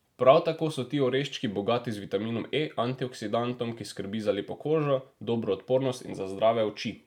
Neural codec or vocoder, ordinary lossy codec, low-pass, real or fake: none; none; 19.8 kHz; real